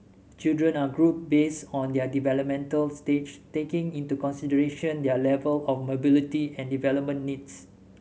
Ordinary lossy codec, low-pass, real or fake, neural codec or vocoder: none; none; real; none